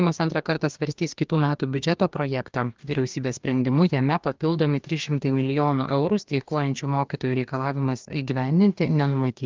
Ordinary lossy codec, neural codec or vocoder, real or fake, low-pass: Opus, 24 kbps; codec, 44.1 kHz, 2.6 kbps, DAC; fake; 7.2 kHz